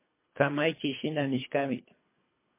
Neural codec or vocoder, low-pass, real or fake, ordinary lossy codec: codec, 24 kHz, 3 kbps, HILCodec; 3.6 kHz; fake; MP3, 24 kbps